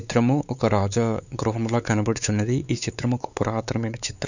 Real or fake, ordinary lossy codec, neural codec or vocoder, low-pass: fake; none; codec, 16 kHz, 4 kbps, X-Codec, WavLM features, trained on Multilingual LibriSpeech; 7.2 kHz